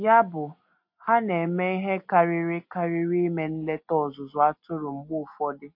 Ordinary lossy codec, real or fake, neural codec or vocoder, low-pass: MP3, 32 kbps; real; none; 5.4 kHz